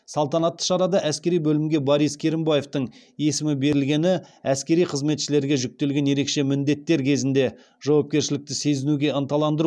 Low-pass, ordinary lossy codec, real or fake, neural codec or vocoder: none; none; real; none